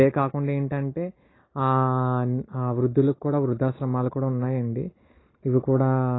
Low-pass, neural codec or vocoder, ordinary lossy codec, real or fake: 7.2 kHz; none; AAC, 16 kbps; real